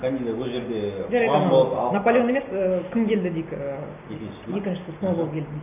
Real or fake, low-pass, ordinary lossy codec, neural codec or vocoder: real; 3.6 kHz; none; none